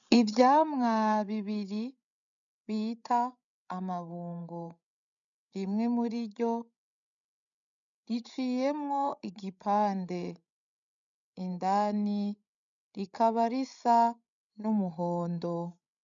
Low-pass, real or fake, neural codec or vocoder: 7.2 kHz; fake; codec, 16 kHz, 16 kbps, FreqCodec, larger model